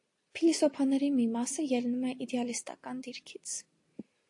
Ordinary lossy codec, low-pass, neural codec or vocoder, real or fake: AAC, 48 kbps; 10.8 kHz; none; real